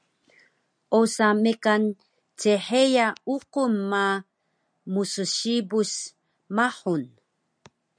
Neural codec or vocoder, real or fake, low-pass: none; real; 9.9 kHz